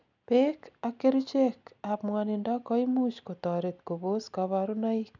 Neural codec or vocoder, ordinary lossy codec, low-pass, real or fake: none; none; 7.2 kHz; real